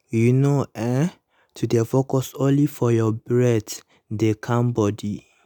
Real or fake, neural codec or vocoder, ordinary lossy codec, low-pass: real; none; none; none